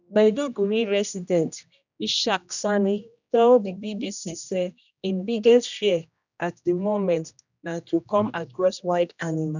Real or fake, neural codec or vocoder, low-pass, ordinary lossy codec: fake; codec, 16 kHz, 1 kbps, X-Codec, HuBERT features, trained on general audio; 7.2 kHz; none